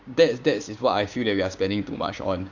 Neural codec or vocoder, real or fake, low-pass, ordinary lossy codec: codec, 16 kHz, 6 kbps, DAC; fake; 7.2 kHz; Opus, 64 kbps